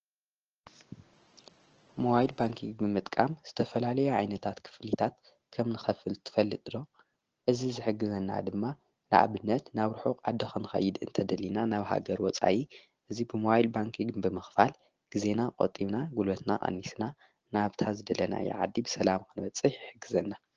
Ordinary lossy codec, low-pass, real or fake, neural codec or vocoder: Opus, 16 kbps; 7.2 kHz; real; none